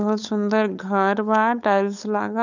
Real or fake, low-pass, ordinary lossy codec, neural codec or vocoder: fake; 7.2 kHz; none; codec, 16 kHz, 8 kbps, FunCodec, trained on LibriTTS, 25 frames a second